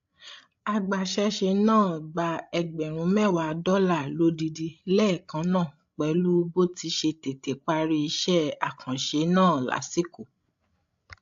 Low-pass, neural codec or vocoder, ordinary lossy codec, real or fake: 7.2 kHz; codec, 16 kHz, 16 kbps, FreqCodec, larger model; AAC, 64 kbps; fake